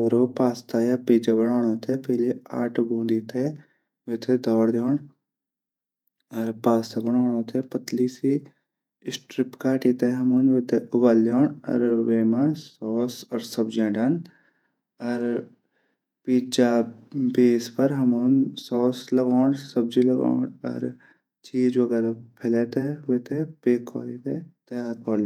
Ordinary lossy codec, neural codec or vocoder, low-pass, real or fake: none; vocoder, 48 kHz, 128 mel bands, Vocos; 19.8 kHz; fake